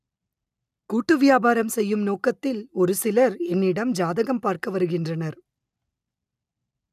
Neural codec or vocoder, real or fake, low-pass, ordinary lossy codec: none; real; 14.4 kHz; none